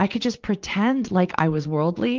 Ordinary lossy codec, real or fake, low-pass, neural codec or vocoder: Opus, 32 kbps; fake; 7.2 kHz; vocoder, 44.1 kHz, 80 mel bands, Vocos